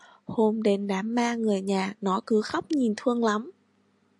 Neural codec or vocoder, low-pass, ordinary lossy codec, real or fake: none; 10.8 kHz; AAC, 64 kbps; real